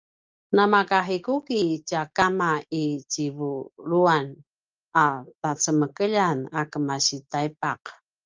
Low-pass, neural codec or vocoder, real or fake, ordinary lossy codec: 7.2 kHz; none; real; Opus, 24 kbps